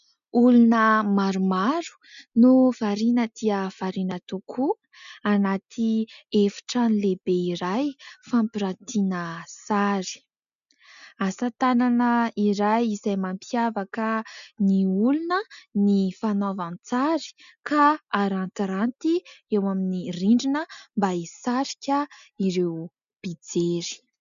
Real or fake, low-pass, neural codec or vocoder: real; 7.2 kHz; none